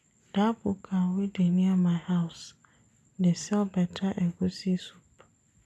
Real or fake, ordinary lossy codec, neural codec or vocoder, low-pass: real; none; none; none